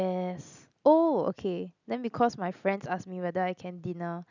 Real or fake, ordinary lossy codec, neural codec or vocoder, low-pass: real; none; none; 7.2 kHz